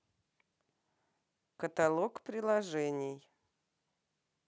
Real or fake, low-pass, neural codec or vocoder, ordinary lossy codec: real; none; none; none